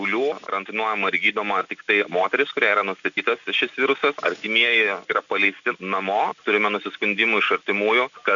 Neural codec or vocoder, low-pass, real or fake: none; 7.2 kHz; real